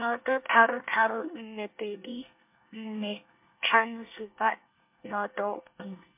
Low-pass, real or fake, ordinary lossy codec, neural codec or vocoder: 3.6 kHz; fake; MP3, 32 kbps; codec, 24 kHz, 1 kbps, SNAC